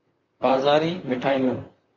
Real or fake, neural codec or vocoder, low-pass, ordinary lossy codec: fake; vocoder, 44.1 kHz, 128 mel bands, Pupu-Vocoder; 7.2 kHz; Opus, 64 kbps